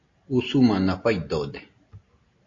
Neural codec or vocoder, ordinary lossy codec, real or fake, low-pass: none; AAC, 32 kbps; real; 7.2 kHz